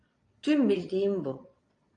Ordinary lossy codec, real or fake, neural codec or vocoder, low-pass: AAC, 64 kbps; fake; vocoder, 22.05 kHz, 80 mel bands, Vocos; 9.9 kHz